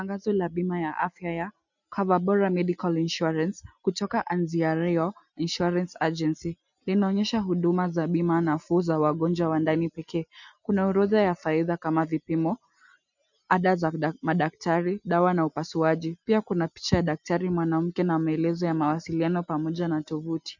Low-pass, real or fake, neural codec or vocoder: 7.2 kHz; real; none